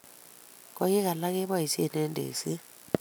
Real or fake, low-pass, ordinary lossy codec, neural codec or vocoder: real; none; none; none